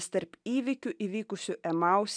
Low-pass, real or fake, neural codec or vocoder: 9.9 kHz; real; none